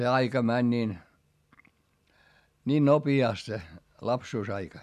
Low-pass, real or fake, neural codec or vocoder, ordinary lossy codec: 14.4 kHz; real; none; none